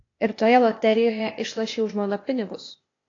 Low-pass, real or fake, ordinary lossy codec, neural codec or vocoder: 7.2 kHz; fake; AAC, 32 kbps; codec, 16 kHz, 0.8 kbps, ZipCodec